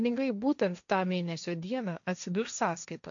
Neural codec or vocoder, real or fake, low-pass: codec, 16 kHz, 1.1 kbps, Voila-Tokenizer; fake; 7.2 kHz